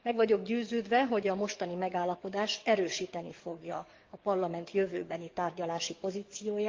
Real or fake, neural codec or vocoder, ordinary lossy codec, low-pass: fake; codec, 44.1 kHz, 7.8 kbps, DAC; Opus, 32 kbps; 7.2 kHz